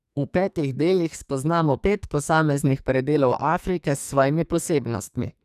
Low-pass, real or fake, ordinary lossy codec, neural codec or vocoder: 14.4 kHz; fake; none; codec, 44.1 kHz, 2.6 kbps, SNAC